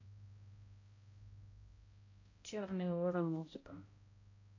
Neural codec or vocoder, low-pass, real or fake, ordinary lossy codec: codec, 16 kHz, 0.5 kbps, X-Codec, HuBERT features, trained on balanced general audio; 7.2 kHz; fake; AAC, 48 kbps